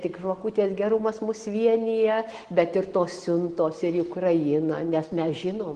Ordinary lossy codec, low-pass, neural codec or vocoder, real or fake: Opus, 16 kbps; 9.9 kHz; none; real